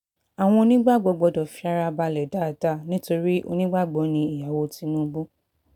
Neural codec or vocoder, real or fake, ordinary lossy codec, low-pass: none; real; none; 19.8 kHz